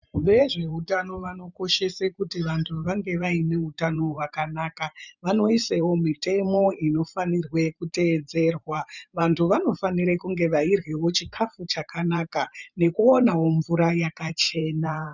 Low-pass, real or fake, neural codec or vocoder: 7.2 kHz; fake; vocoder, 44.1 kHz, 128 mel bands every 512 samples, BigVGAN v2